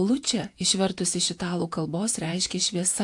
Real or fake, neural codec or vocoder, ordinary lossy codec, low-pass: fake; vocoder, 48 kHz, 128 mel bands, Vocos; AAC, 64 kbps; 10.8 kHz